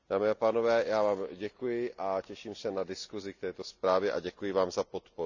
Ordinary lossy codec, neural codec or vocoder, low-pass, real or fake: none; none; 7.2 kHz; real